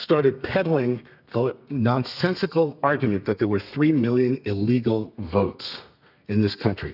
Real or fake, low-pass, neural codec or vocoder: fake; 5.4 kHz; codec, 32 kHz, 1.9 kbps, SNAC